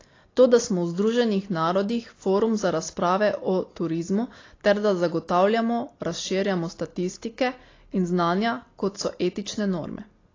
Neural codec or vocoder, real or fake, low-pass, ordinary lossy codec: none; real; 7.2 kHz; AAC, 32 kbps